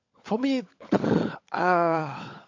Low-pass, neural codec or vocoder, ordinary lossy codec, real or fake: 7.2 kHz; codec, 16 kHz, 16 kbps, FunCodec, trained on LibriTTS, 50 frames a second; MP3, 48 kbps; fake